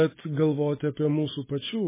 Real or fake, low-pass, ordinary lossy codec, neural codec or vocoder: real; 3.6 kHz; MP3, 16 kbps; none